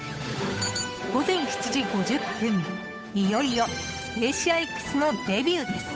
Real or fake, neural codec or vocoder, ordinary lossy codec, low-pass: fake; codec, 16 kHz, 8 kbps, FunCodec, trained on Chinese and English, 25 frames a second; none; none